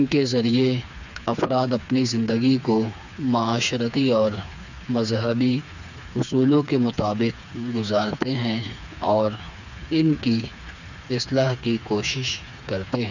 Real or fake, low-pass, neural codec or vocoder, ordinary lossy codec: fake; 7.2 kHz; codec, 16 kHz, 4 kbps, FreqCodec, smaller model; none